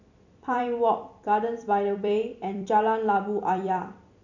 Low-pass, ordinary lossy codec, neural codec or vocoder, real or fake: 7.2 kHz; none; vocoder, 44.1 kHz, 128 mel bands every 256 samples, BigVGAN v2; fake